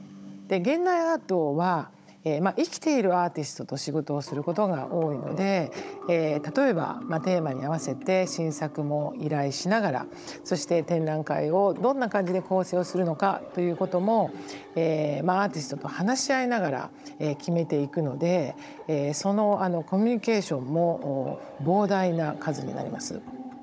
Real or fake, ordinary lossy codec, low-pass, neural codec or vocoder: fake; none; none; codec, 16 kHz, 16 kbps, FunCodec, trained on Chinese and English, 50 frames a second